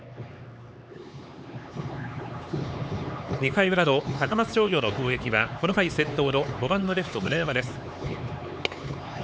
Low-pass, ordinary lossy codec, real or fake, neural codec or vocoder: none; none; fake; codec, 16 kHz, 4 kbps, X-Codec, HuBERT features, trained on LibriSpeech